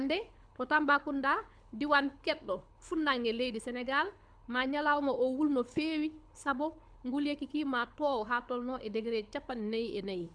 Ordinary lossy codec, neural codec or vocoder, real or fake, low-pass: MP3, 96 kbps; codec, 24 kHz, 6 kbps, HILCodec; fake; 9.9 kHz